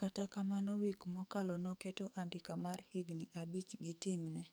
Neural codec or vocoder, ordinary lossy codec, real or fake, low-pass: codec, 44.1 kHz, 2.6 kbps, SNAC; none; fake; none